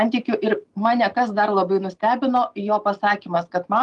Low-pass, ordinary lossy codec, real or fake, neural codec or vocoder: 10.8 kHz; Opus, 32 kbps; real; none